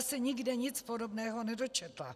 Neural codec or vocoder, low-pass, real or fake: none; 14.4 kHz; real